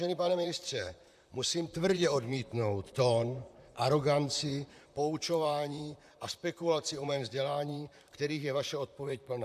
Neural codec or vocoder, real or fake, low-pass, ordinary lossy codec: vocoder, 44.1 kHz, 128 mel bands, Pupu-Vocoder; fake; 14.4 kHz; AAC, 96 kbps